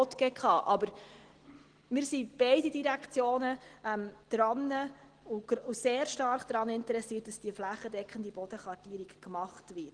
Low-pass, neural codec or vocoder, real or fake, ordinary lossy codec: 9.9 kHz; none; real; Opus, 16 kbps